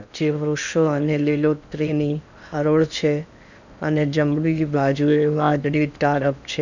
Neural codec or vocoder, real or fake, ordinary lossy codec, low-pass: codec, 16 kHz in and 24 kHz out, 0.8 kbps, FocalCodec, streaming, 65536 codes; fake; none; 7.2 kHz